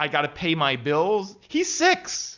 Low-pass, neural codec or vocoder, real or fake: 7.2 kHz; none; real